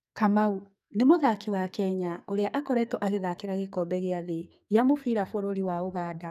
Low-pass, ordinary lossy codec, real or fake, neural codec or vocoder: 14.4 kHz; none; fake; codec, 44.1 kHz, 2.6 kbps, SNAC